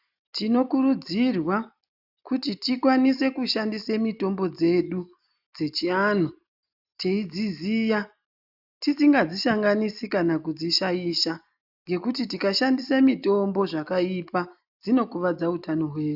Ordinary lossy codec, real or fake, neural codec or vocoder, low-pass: AAC, 48 kbps; real; none; 5.4 kHz